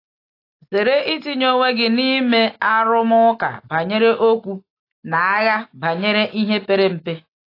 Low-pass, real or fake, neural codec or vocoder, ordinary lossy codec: 5.4 kHz; real; none; AAC, 32 kbps